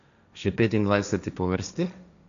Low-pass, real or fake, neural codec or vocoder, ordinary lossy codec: 7.2 kHz; fake; codec, 16 kHz, 1.1 kbps, Voila-Tokenizer; none